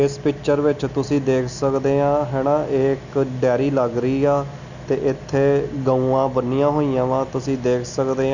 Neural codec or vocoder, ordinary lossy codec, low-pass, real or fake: none; none; 7.2 kHz; real